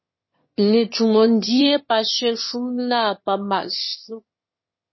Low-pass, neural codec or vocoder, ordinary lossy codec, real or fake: 7.2 kHz; autoencoder, 22.05 kHz, a latent of 192 numbers a frame, VITS, trained on one speaker; MP3, 24 kbps; fake